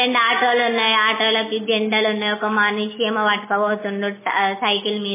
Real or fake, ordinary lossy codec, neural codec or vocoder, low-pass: real; MP3, 16 kbps; none; 3.6 kHz